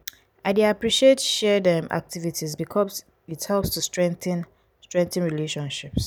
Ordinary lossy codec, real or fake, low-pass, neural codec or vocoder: none; real; none; none